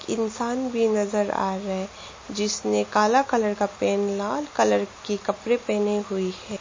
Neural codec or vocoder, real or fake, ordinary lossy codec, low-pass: none; real; MP3, 32 kbps; 7.2 kHz